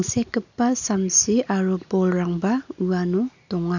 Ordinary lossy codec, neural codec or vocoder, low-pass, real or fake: none; none; 7.2 kHz; real